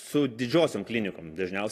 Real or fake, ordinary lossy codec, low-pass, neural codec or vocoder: real; AAC, 48 kbps; 14.4 kHz; none